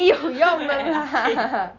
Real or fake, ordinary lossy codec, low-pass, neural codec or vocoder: real; none; 7.2 kHz; none